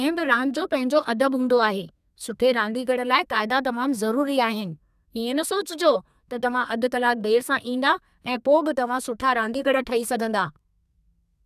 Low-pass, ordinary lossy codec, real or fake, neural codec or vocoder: 14.4 kHz; none; fake; codec, 32 kHz, 1.9 kbps, SNAC